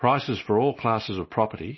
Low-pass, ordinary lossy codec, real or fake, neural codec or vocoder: 7.2 kHz; MP3, 24 kbps; real; none